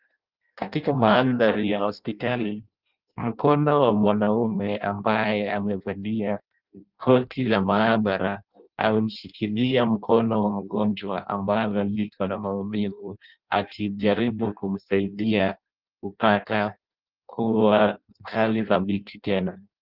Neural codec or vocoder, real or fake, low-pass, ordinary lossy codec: codec, 16 kHz in and 24 kHz out, 0.6 kbps, FireRedTTS-2 codec; fake; 5.4 kHz; Opus, 24 kbps